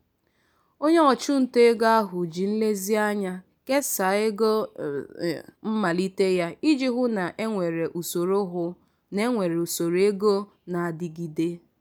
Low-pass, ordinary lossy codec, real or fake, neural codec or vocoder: none; none; real; none